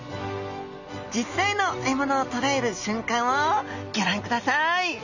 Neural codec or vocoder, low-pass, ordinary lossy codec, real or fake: none; 7.2 kHz; none; real